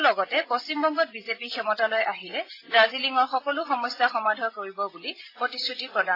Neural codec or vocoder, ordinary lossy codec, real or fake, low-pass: none; AAC, 24 kbps; real; 5.4 kHz